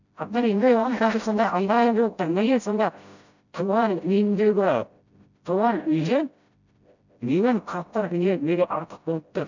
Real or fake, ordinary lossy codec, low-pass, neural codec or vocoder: fake; none; 7.2 kHz; codec, 16 kHz, 0.5 kbps, FreqCodec, smaller model